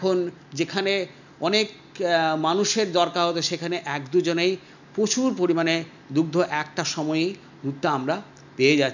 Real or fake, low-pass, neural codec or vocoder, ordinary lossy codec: real; 7.2 kHz; none; none